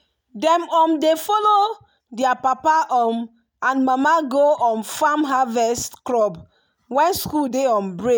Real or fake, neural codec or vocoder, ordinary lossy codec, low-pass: real; none; none; none